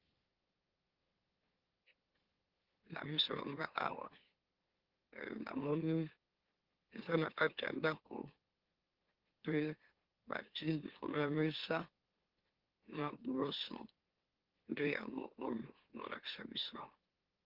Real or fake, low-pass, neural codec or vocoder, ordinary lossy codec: fake; 5.4 kHz; autoencoder, 44.1 kHz, a latent of 192 numbers a frame, MeloTTS; Opus, 16 kbps